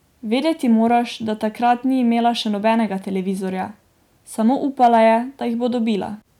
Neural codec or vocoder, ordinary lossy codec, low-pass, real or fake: none; none; 19.8 kHz; real